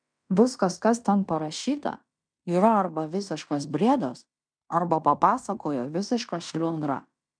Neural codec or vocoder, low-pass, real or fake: codec, 16 kHz in and 24 kHz out, 0.9 kbps, LongCat-Audio-Codec, fine tuned four codebook decoder; 9.9 kHz; fake